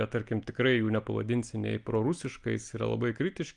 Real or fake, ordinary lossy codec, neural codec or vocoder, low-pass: real; Opus, 32 kbps; none; 9.9 kHz